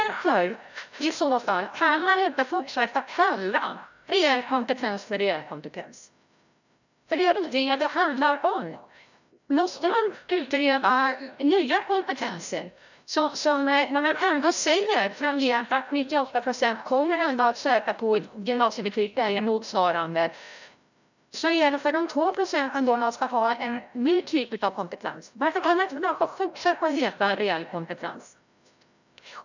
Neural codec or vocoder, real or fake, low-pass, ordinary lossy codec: codec, 16 kHz, 0.5 kbps, FreqCodec, larger model; fake; 7.2 kHz; none